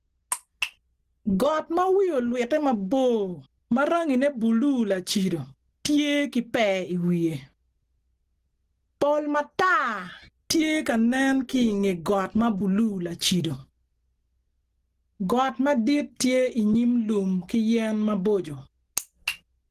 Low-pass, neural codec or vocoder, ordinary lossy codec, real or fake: 14.4 kHz; none; Opus, 16 kbps; real